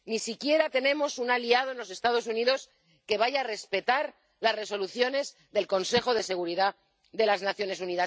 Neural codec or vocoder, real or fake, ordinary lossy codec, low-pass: none; real; none; none